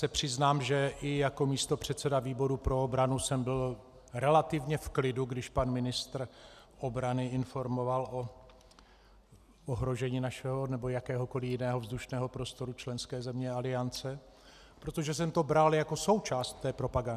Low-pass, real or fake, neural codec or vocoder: 14.4 kHz; real; none